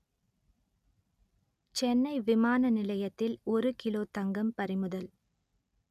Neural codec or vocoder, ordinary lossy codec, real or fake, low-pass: none; none; real; 14.4 kHz